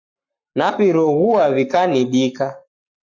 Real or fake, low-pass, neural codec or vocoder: fake; 7.2 kHz; codec, 44.1 kHz, 7.8 kbps, Pupu-Codec